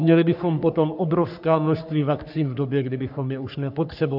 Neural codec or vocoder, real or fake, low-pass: codec, 44.1 kHz, 3.4 kbps, Pupu-Codec; fake; 5.4 kHz